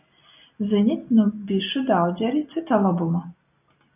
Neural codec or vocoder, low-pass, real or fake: none; 3.6 kHz; real